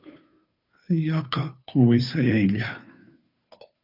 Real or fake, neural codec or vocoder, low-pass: fake; codec, 16 kHz, 2 kbps, FunCodec, trained on Chinese and English, 25 frames a second; 5.4 kHz